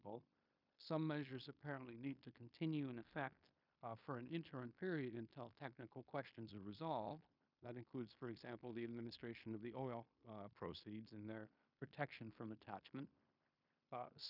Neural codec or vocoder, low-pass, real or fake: codec, 16 kHz in and 24 kHz out, 0.9 kbps, LongCat-Audio-Codec, fine tuned four codebook decoder; 5.4 kHz; fake